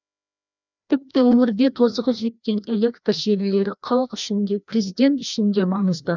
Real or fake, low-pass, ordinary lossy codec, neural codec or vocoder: fake; 7.2 kHz; none; codec, 16 kHz, 1 kbps, FreqCodec, larger model